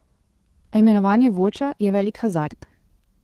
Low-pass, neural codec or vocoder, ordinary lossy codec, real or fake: 14.4 kHz; codec, 32 kHz, 1.9 kbps, SNAC; Opus, 16 kbps; fake